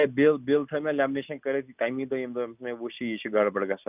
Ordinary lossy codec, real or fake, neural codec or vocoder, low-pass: none; real; none; 3.6 kHz